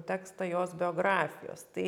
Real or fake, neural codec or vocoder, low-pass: real; none; 19.8 kHz